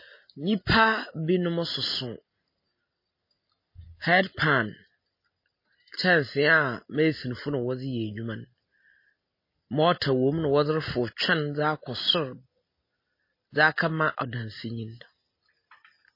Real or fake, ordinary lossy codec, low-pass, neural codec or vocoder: real; MP3, 24 kbps; 5.4 kHz; none